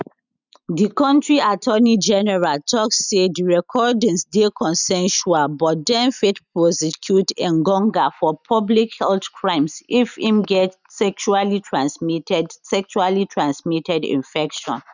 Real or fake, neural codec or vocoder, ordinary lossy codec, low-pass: real; none; none; 7.2 kHz